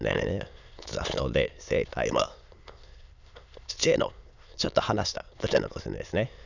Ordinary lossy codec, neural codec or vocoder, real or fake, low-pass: none; autoencoder, 22.05 kHz, a latent of 192 numbers a frame, VITS, trained on many speakers; fake; 7.2 kHz